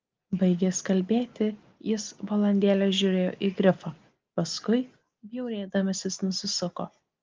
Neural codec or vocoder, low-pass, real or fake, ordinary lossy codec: none; 7.2 kHz; real; Opus, 32 kbps